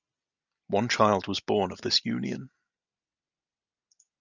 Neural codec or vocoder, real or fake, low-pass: none; real; 7.2 kHz